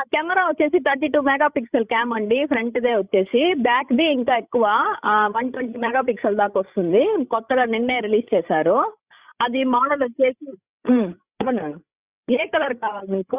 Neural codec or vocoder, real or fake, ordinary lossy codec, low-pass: codec, 16 kHz, 16 kbps, FreqCodec, larger model; fake; Opus, 64 kbps; 3.6 kHz